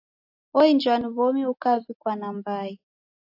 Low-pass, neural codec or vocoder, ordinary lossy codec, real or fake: 5.4 kHz; none; AAC, 48 kbps; real